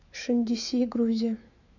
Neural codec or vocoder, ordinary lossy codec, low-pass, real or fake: autoencoder, 48 kHz, 128 numbers a frame, DAC-VAE, trained on Japanese speech; none; 7.2 kHz; fake